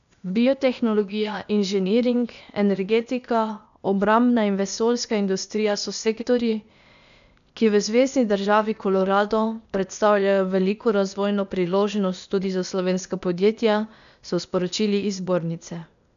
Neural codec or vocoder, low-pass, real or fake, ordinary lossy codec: codec, 16 kHz, 0.8 kbps, ZipCodec; 7.2 kHz; fake; none